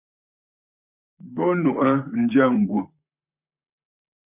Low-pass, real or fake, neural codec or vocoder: 3.6 kHz; fake; vocoder, 44.1 kHz, 128 mel bands, Pupu-Vocoder